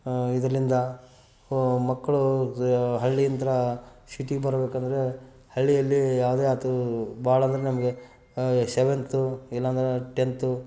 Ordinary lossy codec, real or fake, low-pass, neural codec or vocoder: none; real; none; none